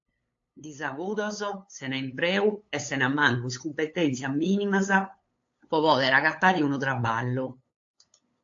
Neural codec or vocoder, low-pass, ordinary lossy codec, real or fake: codec, 16 kHz, 8 kbps, FunCodec, trained on LibriTTS, 25 frames a second; 7.2 kHz; AAC, 48 kbps; fake